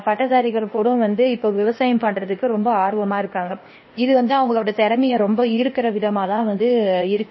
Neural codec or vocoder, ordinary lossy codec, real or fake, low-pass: codec, 16 kHz, 0.8 kbps, ZipCodec; MP3, 24 kbps; fake; 7.2 kHz